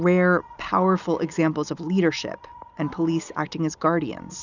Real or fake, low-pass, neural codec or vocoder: real; 7.2 kHz; none